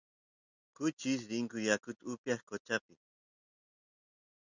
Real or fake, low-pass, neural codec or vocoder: real; 7.2 kHz; none